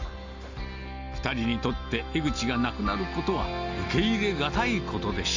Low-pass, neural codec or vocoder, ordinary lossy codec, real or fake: 7.2 kHz; none; Opus, 32 kbps; real